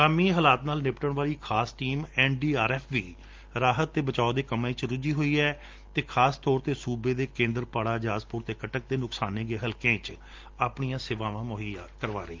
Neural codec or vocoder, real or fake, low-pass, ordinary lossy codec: none; real; 7.2 kHz; Opus, 32 kbps